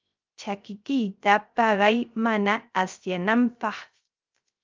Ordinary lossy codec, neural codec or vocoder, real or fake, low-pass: Opus, 32 kbps; codec, 16 kHz, 0.3 kbps, FocalCodec; fake; 7.2 kHz